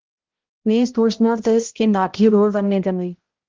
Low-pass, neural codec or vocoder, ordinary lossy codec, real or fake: 7.2 kHz; codec, 16 kHz, 0.5 kbps, X-Codec, HuBERT features, trained on balanced general audio; Opus, 16 kbps; fake